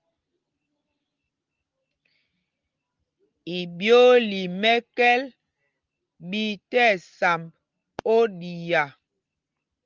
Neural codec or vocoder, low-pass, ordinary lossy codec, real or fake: none; 7.2 kHz; Opus, 32 kbps; real